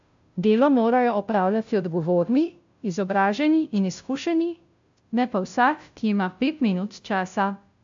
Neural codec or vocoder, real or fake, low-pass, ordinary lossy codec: codec, 16 kHz, 0.5 kbps, FunCodec, trained on Chinese and English, 25 frames a second; fake; 7.2 kHz; AAC, 64 kbps